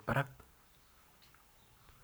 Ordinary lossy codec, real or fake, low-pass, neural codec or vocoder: none; fake; none; codec, 44.1 kHz, 7.8 kbps, Pupu-Codec